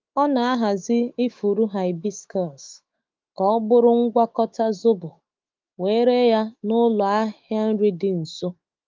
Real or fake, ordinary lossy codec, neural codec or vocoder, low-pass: fake; Opus, 32 kbps; autoencoder, 48 kHz, 128 numbers a frame, DAC-VAE, trained on Japanese speech; 7.2 kHz